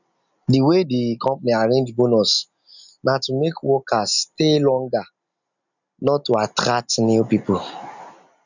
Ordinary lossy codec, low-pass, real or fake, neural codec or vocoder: none; 7.2 kHz; real; none